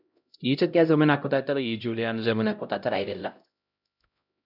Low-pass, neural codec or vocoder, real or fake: 5.4 kHz; codec, 16 kHz, 0.5 kbps, X-Codec, HuBERT features, trained on LibriSpeech; fake